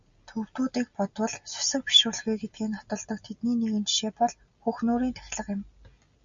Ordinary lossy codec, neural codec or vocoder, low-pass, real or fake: Opus, 64 kbps; none; 7.2 kHz; real